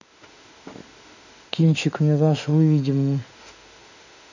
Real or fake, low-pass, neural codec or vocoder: fake; 7.2 kHz; autoencoder, 48 kHz, 32 numbers a frame, DAC-VAE, trained on Japanese speech